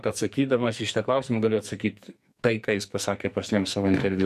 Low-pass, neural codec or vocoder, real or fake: 14.4 kHz; codec, 44.1 kHz, 2.6 kbps, SNAC; fake